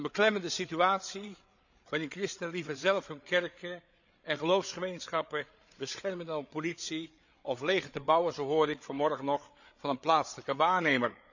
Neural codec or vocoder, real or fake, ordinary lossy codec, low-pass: codec, 16 kHz, 8 kbps, FreqCodec, larger model; fake; none; 7.2 kHz